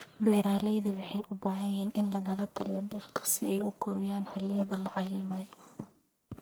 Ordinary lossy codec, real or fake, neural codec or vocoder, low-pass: none; fake; codec, 44.1 kHz, 1.7 kbps, Pupu-Codec; none